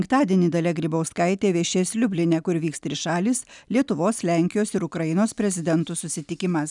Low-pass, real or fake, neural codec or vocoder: 10.8 kHz; real; none